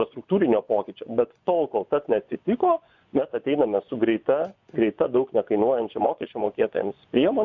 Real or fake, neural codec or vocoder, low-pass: fake; vocoder, 24 kHz, 100 mel bands, Vocos; 7.2 kHz